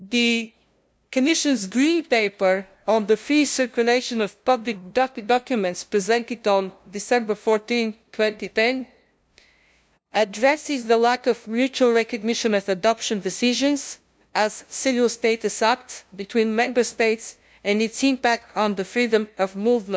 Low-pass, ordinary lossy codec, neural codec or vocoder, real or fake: none; none; codec, 16 kHz, 0.5 kbps, FunCodec, trained on LibriTTS, 25 frames a second; fake